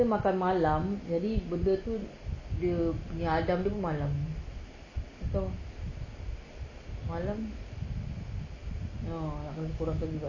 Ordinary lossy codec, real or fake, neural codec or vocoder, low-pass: none; real; none; 7.2 kHz